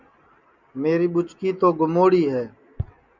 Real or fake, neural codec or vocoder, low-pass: real; none; 7.2 kHz